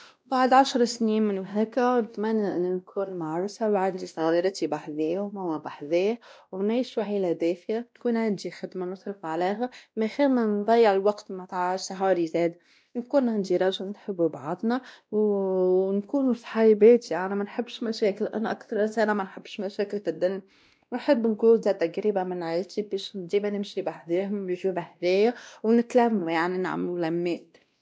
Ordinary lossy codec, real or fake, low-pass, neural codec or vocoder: none; fake; none; codec, 16 kHz, 1 kbps, X-Codec, WavLM features, trained on Multilingual LibriSpeech